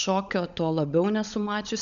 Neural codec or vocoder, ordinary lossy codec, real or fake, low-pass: codec, 16 kHz, 16 kbps, FunCodec, trained on LibriTTS, 50 frames a second; MP3, 96 kbps; fake; 7.2 kHz